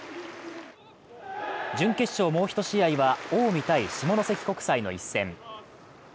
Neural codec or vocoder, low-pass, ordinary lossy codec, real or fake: none; none; none; real